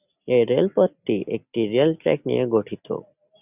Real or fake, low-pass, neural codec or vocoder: real; 3.6 kHz; none